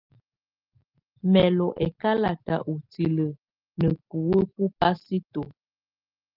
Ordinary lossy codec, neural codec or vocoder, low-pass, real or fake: Opus, 32 kbps; none; 5.4 kHz; real